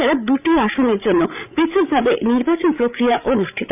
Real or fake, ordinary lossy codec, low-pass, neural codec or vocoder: fake; none; 3.6 kHz; codec, 16 kHz, 16 kbps, FreqCodec, larger model